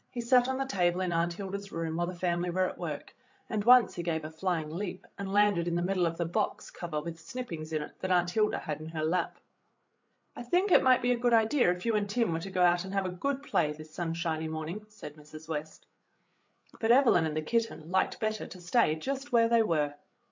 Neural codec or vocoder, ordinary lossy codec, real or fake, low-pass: codec, 16 kHz, 8 kbps, FreqCodec, larger model; MP3, 48 kbps; fake; 7.2 kHz